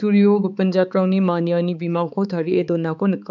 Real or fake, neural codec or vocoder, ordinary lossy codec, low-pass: fake; codec, 16 kHz, 4 kbps, X-Codec, HuBERT features, trained on balanced general audio; none; 7.2 kHz